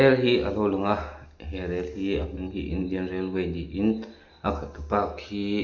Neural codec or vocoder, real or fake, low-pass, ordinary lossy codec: none; real; 7.2 kHz; none